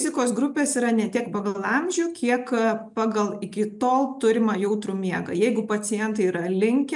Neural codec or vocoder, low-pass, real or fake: none; 10.8 kHz; real